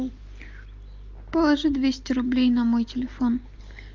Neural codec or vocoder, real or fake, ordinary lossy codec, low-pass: none; real; Opus, 24 kbps; 7.2 kHz